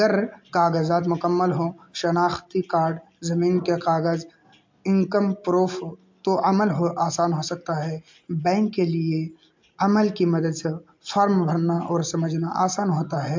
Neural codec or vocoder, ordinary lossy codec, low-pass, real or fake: none; MP3, 48 kbps; 7.2 kHz; real